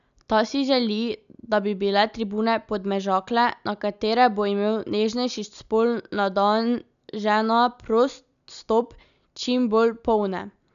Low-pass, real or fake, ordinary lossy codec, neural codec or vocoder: 7.2 kHz; real; none; none